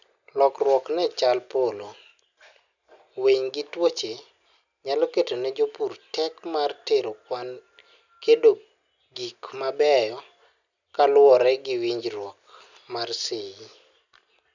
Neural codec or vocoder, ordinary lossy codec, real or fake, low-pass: none; none; real; 7.2 kHz